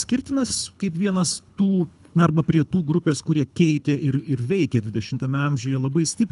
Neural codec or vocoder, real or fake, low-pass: codec, 24 kHz, 3 kbps, HILCodec; fake; 10.8 kHz